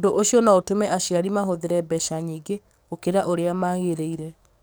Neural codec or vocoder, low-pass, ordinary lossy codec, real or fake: codec, 44.1 kHz, 7.8 kbps, DAC; none; none; fake